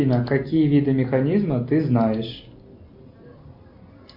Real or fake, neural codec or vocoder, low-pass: real; none; 5.4 kHz